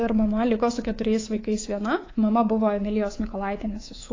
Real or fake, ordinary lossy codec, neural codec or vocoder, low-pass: fake; AAC, 32 kbps; codec, 24 kHz, 3.1 kbps, DualCodec; 7.2 kHz